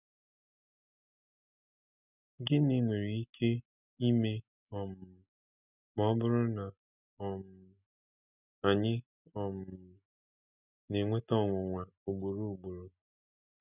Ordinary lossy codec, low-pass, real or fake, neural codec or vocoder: none; 3.6 kHz; real; none